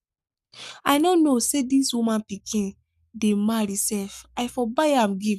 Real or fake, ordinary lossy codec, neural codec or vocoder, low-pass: fake; none; codec, 44.1 kHz, 7.8 kbps, Pupu-Codec; 14.4 kHz